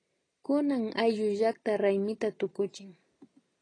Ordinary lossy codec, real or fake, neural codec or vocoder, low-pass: AAC, 32 kbps; real; none; 9.9 kHz